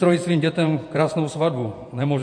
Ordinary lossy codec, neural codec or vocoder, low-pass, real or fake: MP3, 48 kbps; vocoder, 48 kHz, 128 mel bands, Vocos; 9.9 kHz; fake